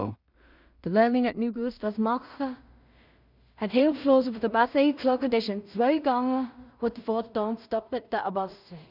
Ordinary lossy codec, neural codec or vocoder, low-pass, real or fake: none; codec, 16 kHz in and 24 kHz out, 0.4 kbps, LongCat-Audio-Codec, two codebook decoder; 5.4 kHz; fake